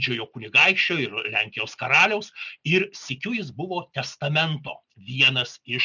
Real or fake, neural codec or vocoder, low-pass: real; none; 7.2 kHz